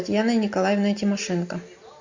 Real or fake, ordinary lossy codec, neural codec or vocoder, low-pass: real; MP3, 48 kbps; none; 7.2 kHz